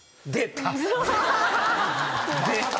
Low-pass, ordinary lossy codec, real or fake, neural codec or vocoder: none; none; real; none